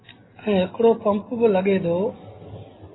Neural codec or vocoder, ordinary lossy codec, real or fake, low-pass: none; AAC, 16 kbps; real; 7.2 kHz